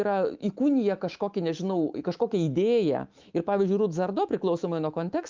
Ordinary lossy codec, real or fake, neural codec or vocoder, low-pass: Opus, 32 kbps; fake; autoencoder, 48 kHz, 128 numbers a frame, DAC-VAE, trained on Japanese speech; 7.2 kHz